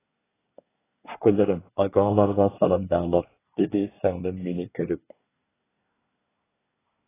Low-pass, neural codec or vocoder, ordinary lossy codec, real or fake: 3.6 kHz; codec, 24 kHz, 1 kbps, SNAC; AAC, 16 kbps; fake